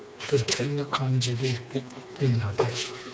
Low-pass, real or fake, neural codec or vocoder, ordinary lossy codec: none; fake; codec, 16 kHz, 2 kbps, FreqCodec, smaller model; none